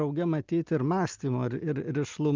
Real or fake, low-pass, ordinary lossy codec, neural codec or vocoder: real; 7.2 kHz; Opus, 32 kbps; none